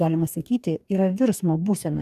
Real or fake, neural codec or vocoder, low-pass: fake; codec, 44.1 kHz, 2.6 kbps, DAC; 14.4 kHz